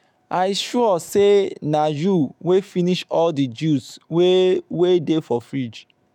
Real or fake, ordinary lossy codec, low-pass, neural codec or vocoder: fake; MP3, 96 kbps; 19.8 kHz; autoencoder, 48 kHz, 128 numbers a frame, DAC-VAE, trained on Japanese speech